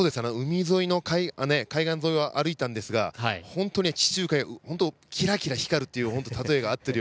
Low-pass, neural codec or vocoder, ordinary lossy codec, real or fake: none; none; none; real